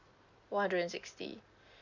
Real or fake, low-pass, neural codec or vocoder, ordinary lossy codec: real; 7.2 kHz; none; Opus, 64 kbps